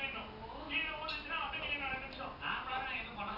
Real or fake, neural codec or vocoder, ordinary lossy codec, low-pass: real; none; none; 5.4 kHz